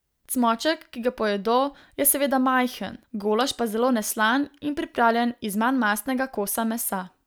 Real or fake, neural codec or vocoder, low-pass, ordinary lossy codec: real; none; none; none